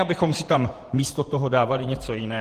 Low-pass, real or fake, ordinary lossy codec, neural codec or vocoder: 14.4 kHz; fake; Opus, 16 kbps; vocoder, 48 kHz, 128 mel bands, Vocos